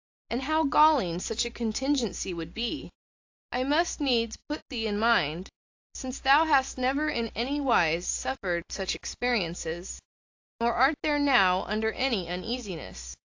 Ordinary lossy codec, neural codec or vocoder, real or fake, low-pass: AAC, 48 kbps; none; real; 7.2 kHz